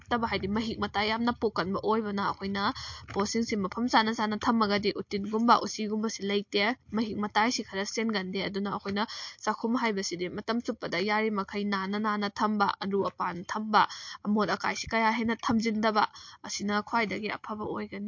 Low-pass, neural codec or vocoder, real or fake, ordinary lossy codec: 7.2 kHz; none; real; MP3, 48 kbps